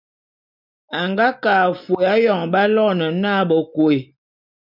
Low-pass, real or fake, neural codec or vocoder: 5.4 kHz; real; none